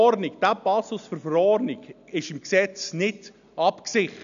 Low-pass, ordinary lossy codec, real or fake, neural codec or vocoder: 7.2 kHz; none; real; none